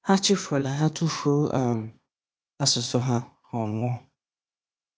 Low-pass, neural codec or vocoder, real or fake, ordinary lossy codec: none; codec, 16 kHz, 0.8 kbps, ZipCodec; fake; none